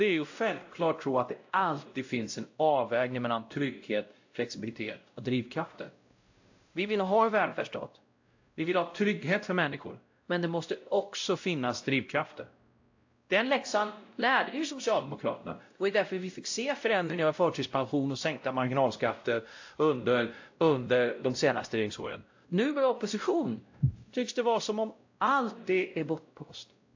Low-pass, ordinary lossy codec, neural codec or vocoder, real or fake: 7.2 kHz; AAC, 48 kbps; codec, 16 kHz, 0.5 kbps, X-Codec, WavLM features, trained on Multilingual LibriSpeech; fake